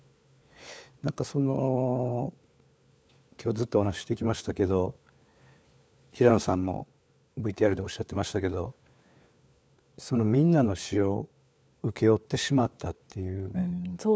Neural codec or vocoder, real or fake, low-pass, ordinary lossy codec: codec, 16 kHz, 4 kbps, FunCodec, trained on LibriTTS, 50 frames a second; fake; none; none